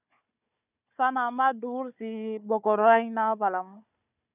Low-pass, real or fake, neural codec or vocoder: 3.6 kHz; fake; codec, 16 kHz, 4 kbps, FunCodec, trained on Chinese and English, 50 frames a second